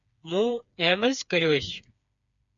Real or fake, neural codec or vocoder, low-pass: fake; codec, 16 kHz, 4 kbps, FreqCodec, smaller model; 7.2 kHz